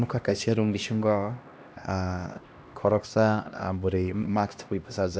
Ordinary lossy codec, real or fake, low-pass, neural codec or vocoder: none; fake; none; codec, 16 kHz, 1 kbps, X-Codec, HuBERT features, trained on LibriSpeech